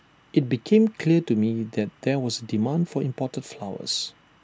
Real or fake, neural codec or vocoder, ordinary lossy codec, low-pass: real; none; none; none